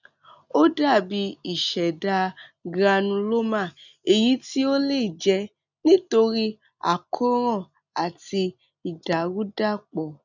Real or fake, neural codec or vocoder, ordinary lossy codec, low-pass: real; none; none; 7.2 kHz